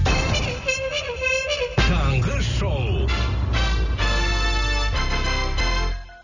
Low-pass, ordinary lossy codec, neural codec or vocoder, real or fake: 7.2 kHz; none; none; real